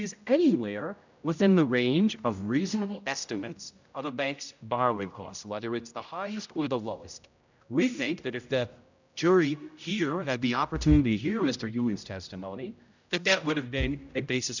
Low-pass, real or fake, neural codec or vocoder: 7.2 kHz; fake; codec, 16 kHz, 0.5 kbps, X-Codec, HuBERT features, trained on general audio